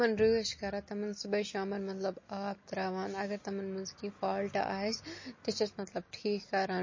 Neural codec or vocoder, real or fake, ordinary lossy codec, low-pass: none; real; MP3, 32 kbps; 7.2 kHz